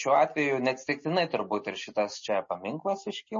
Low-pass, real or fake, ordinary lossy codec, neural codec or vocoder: 7.2 kHz; real; MP3, 32 kbps; none